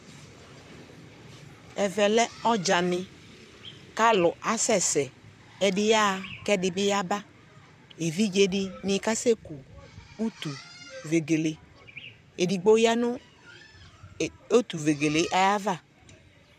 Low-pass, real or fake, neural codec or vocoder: 14.4 kHz; fake; vocoder, 44.1 kHz, 128 mel bands, Pupu-Vocoder